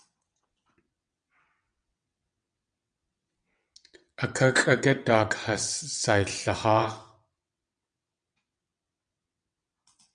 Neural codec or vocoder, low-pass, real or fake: vocoder, 22.05 kHz, 80 mel bands, WaveNeXt; 9.9 kHz; fake